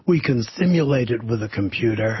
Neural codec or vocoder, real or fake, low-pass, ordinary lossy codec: none; real; 7.2 kHz; MP3, 24 kbps